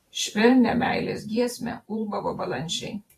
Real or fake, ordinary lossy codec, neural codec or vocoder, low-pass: fake; AAC, 48 kbps; vocoder, 44.1 kHz, 128 mel bands every 512 samples, BigVGAN v2; 14.4 kHz